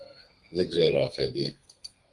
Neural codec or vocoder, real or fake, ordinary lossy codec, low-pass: codec, 32 kHz, 1.9 kbps, SNAC; fake; Opus, 32 kbps; 10.8 kHz